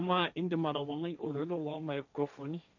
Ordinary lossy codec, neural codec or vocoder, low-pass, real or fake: none; codec, 16 kHz, 1.1 kbps, Voila-Tokenizer; 7.2 kHz; fake